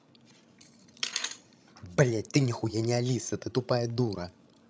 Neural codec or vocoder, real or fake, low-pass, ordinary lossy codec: codec, 16 kHz, 16 kbps, FreqCodec, larger model; fake; none; none